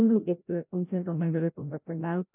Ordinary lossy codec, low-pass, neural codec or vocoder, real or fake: none; 3.6 kHz; codec, 16 kHz, 0.5 kbps, FreqCodec, larger model; fake